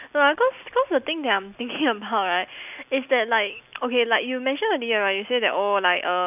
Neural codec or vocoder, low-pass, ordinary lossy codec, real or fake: none; 3.6 kHz; none; real